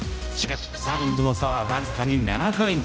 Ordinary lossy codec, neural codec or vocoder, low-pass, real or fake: none; codec, 16 kHz, 0.5 kbps, X-Codec, HuBERT features, trained on general audio; none; fake